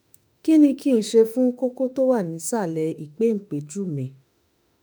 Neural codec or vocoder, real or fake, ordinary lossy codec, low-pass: autoencoder, 48 kHz, 32 numbers a frame, DAC-VAE, trained on Japanese speech; fake; none; none